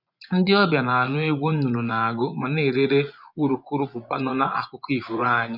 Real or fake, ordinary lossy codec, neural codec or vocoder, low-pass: fake; none; vocoder, 24 kHz, 100 mel bands, Vocos; 5.4 kHz